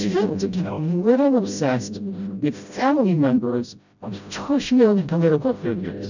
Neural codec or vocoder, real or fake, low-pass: codec, 16 kHz, 0.5 kbps, FreqCodec, smaller model; fake; 7.2 kHz